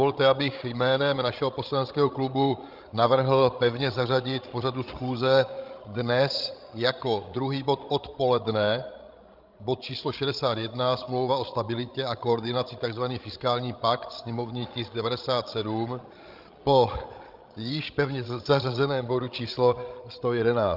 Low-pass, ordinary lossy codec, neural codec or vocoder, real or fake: 5.4 kHz; Opus, 24 kbps; codec, 16 kHz, 16 kbps, FreqCodec, larger model; fake